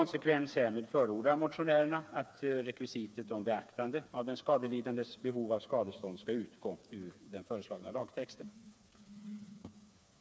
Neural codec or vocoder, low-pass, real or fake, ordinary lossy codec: codec, 16 kHz, 4 kbps, FreqCodec, smaller model; none; fake; none